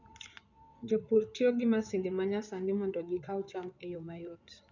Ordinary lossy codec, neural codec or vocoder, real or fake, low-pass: none; codec, 16 kHz in and 24 kHz out, 2.2 kbps, FireRedTTS-2 codec; fake; 7.2 kHz